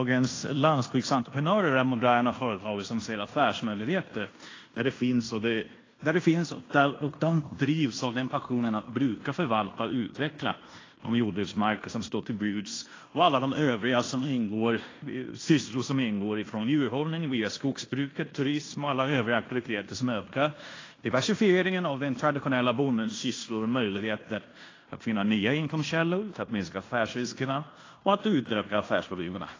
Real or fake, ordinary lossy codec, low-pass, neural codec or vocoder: fake; AAC, 32 kbps; 7.2 kHz; codec, 16 kHz in and 24 kHz out, 0.9 kbps, LongCat-Audio-Codec, fine tuned four codebook decoder